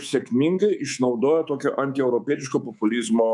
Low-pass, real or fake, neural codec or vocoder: 10.8 kHz; fake; codec, 24 kHz, 3.1 kbps, DualCodec